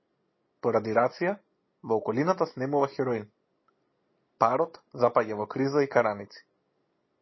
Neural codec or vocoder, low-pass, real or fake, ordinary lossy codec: none; 7.2 kHz; real; MP3, 24 kbps